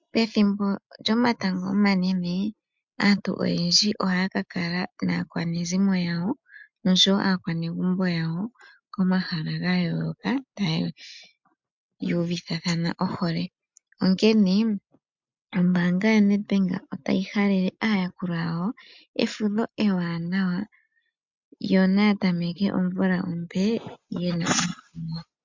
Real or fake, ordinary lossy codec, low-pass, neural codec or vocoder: real; MP3, 64 kbps; 7.2 kHz; none